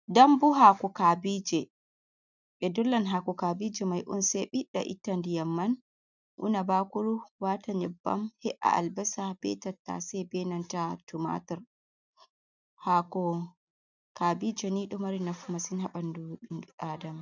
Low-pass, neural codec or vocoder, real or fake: 7.2 kHz; none; real